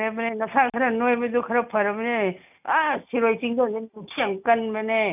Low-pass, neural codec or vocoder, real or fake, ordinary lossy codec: 3.6 kHz; none; real; none